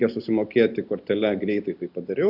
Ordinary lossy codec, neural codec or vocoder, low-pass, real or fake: AAC, 48 kbps; none; 5.4 kHz; real